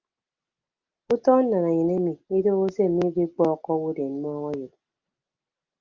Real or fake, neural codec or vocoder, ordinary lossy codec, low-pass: real; none; Opus, 24 kbps; 7.2 kHz